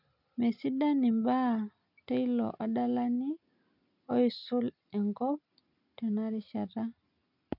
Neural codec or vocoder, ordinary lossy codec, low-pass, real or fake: none; none; 5.4 kHz; real